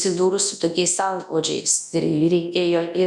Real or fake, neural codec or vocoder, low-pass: fake; codec, 24 kHz, 0.9 kbps, WavTokenizer, large speech release; 10.8 kHz